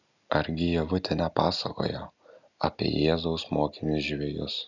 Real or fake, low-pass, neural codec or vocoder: real; 7.2 kHz; none